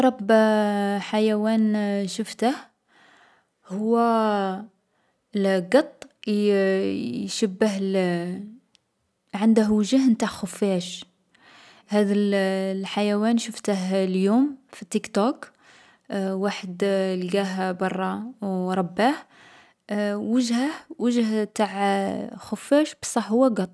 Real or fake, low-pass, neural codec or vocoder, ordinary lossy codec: real; none; none; none